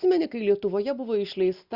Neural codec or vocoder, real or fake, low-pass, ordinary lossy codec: none; real; 5.4 kHz; Opus, 64 kbps